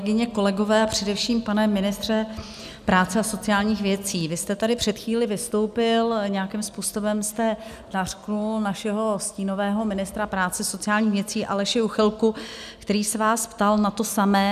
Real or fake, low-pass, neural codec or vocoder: real; 14.4 kHz; none